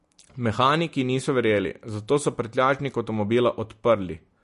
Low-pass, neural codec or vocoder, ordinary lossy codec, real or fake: 10.8 kHz; none; MP3, 48 kbps; real